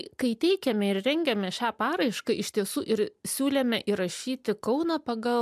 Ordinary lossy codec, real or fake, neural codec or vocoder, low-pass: MP3, 96 kbps; real; none; 14.4 kHz